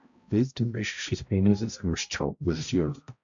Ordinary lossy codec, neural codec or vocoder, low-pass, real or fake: AAC, 64 kbps; codec, 16 kHz, 0.5 kbps, X-Codec, HuBERT features, trained on balanced general audio; 7.2 kHz; fake